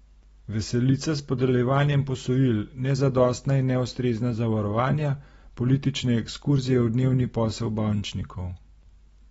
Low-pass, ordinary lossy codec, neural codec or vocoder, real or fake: 14.4 kHz; AAC, 24 kbps; none; real